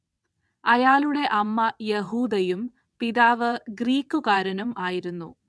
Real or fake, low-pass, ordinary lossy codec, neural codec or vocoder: fake; none; none; vocoder, 22.05 kHz, 80 mel bands, WaveNeXt